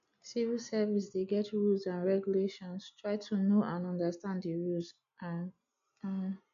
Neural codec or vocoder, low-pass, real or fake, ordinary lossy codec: none; 7.2 kHz; real; none